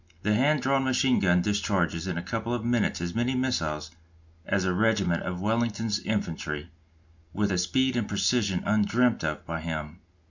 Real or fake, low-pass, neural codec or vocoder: real; 7.2 kHz; none